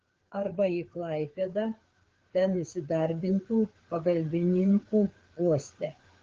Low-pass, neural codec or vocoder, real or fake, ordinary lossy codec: 7.2 kHz; codec, 16 kHz, 4 kbps, FreqCodec, larger model; fake; Opus, 16 kbps